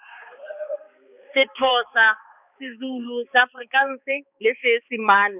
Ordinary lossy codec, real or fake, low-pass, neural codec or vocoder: none; fake; 3.6 kHz; codec, 16 kHz, 4 kbps, X-Codec, HuBERT features, trained on balanced general audio